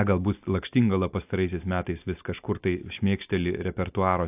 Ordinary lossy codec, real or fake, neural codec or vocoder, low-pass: AAC, 32 kbps; real; none; 3.6 kHz